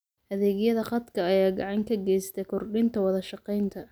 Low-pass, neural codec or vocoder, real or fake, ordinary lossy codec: none; none; real; none